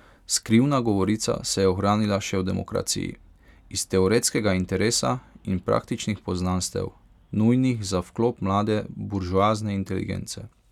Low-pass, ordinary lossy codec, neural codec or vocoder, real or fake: 19.8 kHz; none; none; real